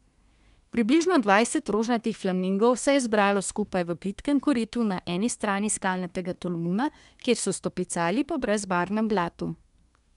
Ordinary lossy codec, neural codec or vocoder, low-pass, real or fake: none; codec, 24 kHz, 1 kbps, SNAC; 10.8 kHz; fake